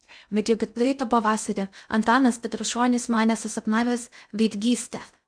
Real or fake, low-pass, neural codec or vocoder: fake; 9.9 kHz; codec, 16 kHz in and 24 kHz out, 0.6 kbps, FocalCodec, streaming, 2048 codes